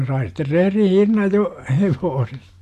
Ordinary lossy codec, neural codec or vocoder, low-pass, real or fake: AAC, 64 kbps; none; 14.4 kHz; real